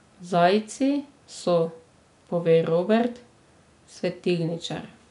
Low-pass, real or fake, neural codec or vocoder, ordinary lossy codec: 10.8 kHz; real; none; MP3, 96 kbps